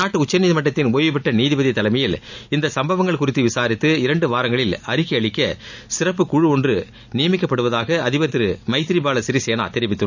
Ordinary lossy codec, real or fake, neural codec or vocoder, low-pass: none; real; none; 7.2 kHz